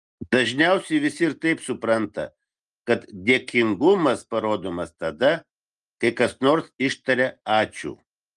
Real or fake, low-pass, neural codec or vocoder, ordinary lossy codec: real; 10.8 kHz; none; Opus, 32 kbps